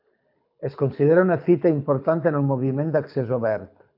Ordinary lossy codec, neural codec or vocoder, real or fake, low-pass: AAC, 48 kbps; codec, 24 kHz, 6 kbps, HILCodec; fake; 5.4 kHz